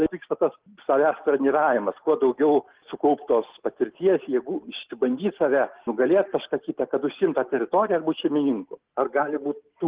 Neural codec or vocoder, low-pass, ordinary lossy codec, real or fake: none; 3.6 kHz; Opus, 16 kbps; real